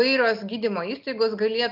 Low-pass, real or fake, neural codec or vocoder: 5.4 kHz; real; none